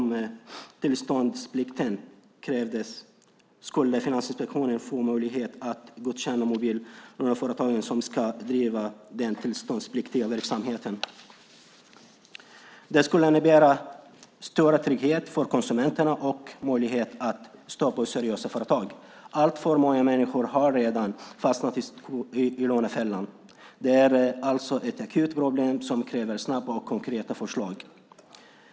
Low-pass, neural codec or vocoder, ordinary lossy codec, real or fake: none; none; none; real